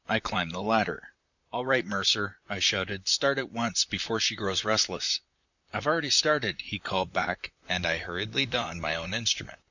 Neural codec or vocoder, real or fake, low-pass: vocoder, 44.1 kHz, 128 mel bands, Pupu-Vocoder; fake; 7.2 kHz